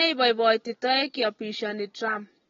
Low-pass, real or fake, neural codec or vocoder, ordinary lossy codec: 7.2 kHz; real; none; AAC, 24 kbps